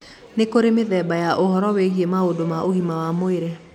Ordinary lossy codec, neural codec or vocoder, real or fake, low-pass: none; none; real; 19.8 kHz